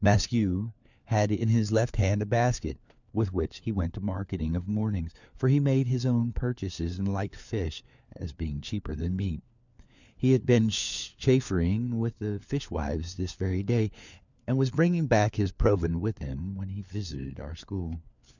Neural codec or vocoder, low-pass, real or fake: codec, 16 kHz, 4 kbps, FunCodec, trained on LibriTTS, 50 frames a second; 7.2 kHz; fake